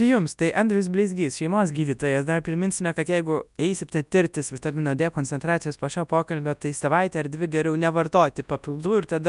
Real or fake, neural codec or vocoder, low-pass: fake; codec, 24 kHz, 0.9 kbps, WavTokenizer, large speech release; 10.8 kHz